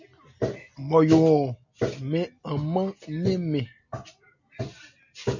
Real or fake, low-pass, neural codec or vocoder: real; 7.2 kHz; none